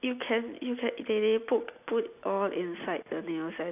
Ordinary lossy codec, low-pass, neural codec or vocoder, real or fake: AAC, 32 kbps; 3.6 kHz; none; real